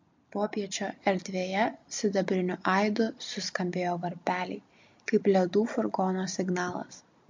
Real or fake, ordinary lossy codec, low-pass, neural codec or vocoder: real; MP3, 48 kbps; 7.2 kHz; none